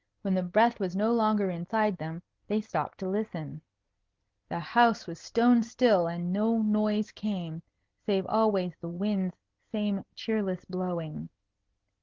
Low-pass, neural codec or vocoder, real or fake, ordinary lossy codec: 7.2 kHz; none; real; Opus, 16 kbps